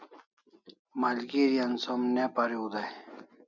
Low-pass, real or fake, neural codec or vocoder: 7.2 kHz; real; none